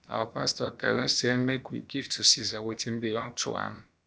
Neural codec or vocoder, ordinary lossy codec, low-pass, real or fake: codec, 16 kHz, about 1 kbps, DyCAST, with the encoder's durations; none; none; fake